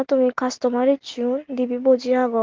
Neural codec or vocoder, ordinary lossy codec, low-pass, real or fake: none; Opus, 16 kbps; 7.2 kHz; real